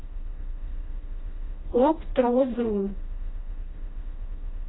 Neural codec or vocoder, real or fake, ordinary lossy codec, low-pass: codec, 16 kHz, 1 kbps, FreqCodec, smaller model; fake; AAC, 16 kbps; 7.2 kHz